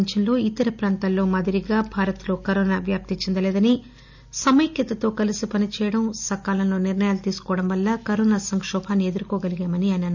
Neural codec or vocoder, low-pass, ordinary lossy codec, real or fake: none; 7.2 kHz; none; real